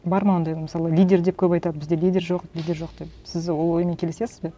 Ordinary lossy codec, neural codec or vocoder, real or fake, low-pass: none; none; real; none